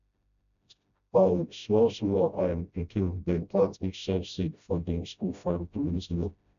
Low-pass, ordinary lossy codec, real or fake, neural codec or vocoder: 7.2 kHz; AAC, 96 kbps; fake; codec, 16 kHz, 0.5 kbps, FreqCodec, smaller model